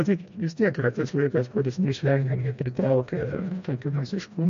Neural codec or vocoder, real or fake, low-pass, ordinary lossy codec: codec, 16 kHz, 1 kbps, FreqCodec, smaller model; fake; 7.2 kHz; MP3, 48 kbps